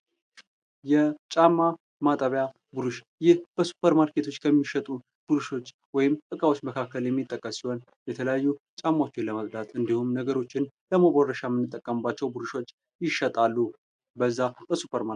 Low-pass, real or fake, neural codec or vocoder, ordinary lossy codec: 9.9 kHz; real; none; AAC, 96 kbps